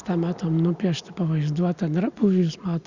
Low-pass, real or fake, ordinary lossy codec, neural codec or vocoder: 7.2 kHz; real; Opus, 64 kbps; none